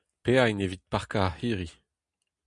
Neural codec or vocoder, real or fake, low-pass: none; real; 10.8 kHz